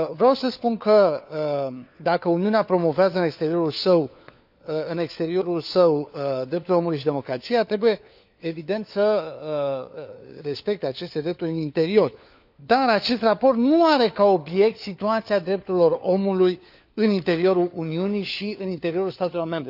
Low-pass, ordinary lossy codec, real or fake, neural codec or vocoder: 5.4 kHz; none; fake; codec, 16 kHz, 4 kbps, FunCodec, trained on LibriTTS, 50 frames a second